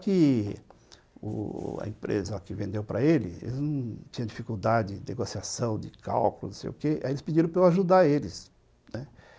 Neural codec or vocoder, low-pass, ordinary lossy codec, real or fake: none; none; none; real